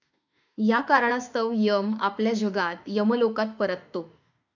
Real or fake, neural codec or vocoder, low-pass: fake; autoencoder, 48 kHz, 32 numbers a frame, DAC-VAE, trained on Japanese speech; 7.2 kHz